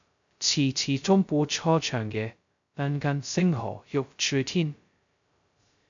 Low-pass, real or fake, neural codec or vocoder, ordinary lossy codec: 7.2 kHz; fake; codec, 16 kHz, 0.2 kbps, FocalCodec; AAC, 64 kbps